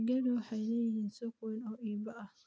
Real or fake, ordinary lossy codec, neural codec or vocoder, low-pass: real; none; none; none